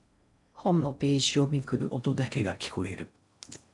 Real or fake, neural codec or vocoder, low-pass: fake; codec, 16 kHz in and 24 kHz out, 0.6 kbps, FocalCodec, streaming, 4096 codes; 10.8 kHz